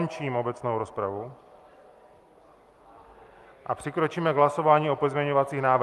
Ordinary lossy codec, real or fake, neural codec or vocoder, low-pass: Opus, 32 kbps; real; none; 14.4 kHz